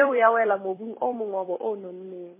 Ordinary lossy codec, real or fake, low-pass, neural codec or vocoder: MP3, 16 kbps; fake; 3.6 kHz; vocoder, 44.1 kHz, 128 mel bands every 256 samples, BigVGAN v2